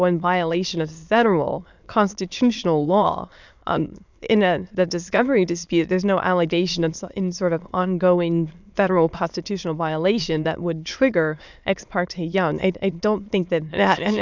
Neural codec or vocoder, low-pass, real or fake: autoencoder, 22.05 kHz, a latent of 192 numbers a frame, VITS, trained on many speakers; 7.2 kHz; fake